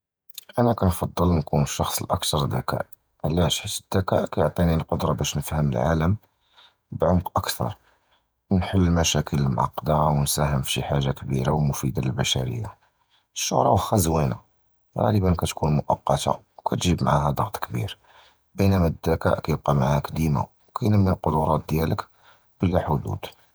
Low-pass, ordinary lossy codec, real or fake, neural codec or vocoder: none; none; fake; vocoder, 48 kHz, 128 mel bands, Vocos